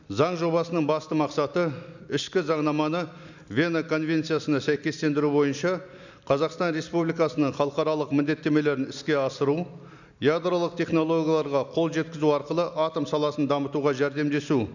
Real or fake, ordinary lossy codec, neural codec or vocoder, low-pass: real; none; none; 7.2 kHz